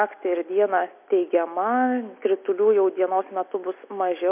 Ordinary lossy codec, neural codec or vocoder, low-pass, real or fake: MP3, 32 kbps; none; 3.6 kHz; real